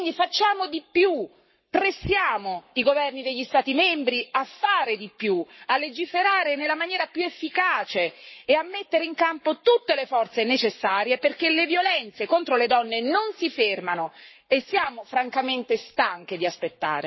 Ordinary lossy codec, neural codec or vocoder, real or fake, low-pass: MP3, 24 kbps; codec, 44.1 kHz, 7.8 kbps, Pupu-Codec; fake; 7.2 kHz